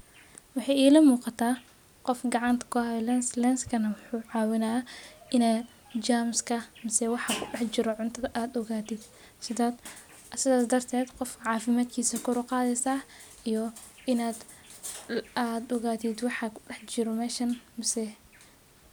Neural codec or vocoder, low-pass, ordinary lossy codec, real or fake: none; none; none; real